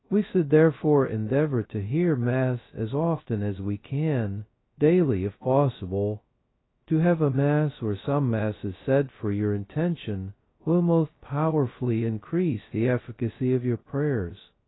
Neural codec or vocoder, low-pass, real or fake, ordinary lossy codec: codec, 16 kHz, 0.2 kbps, FocalCodec; 7.2 kHz; fake; AAC, 16 kbps